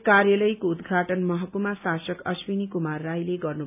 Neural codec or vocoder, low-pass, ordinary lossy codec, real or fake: none; 3.6 kHz; none; real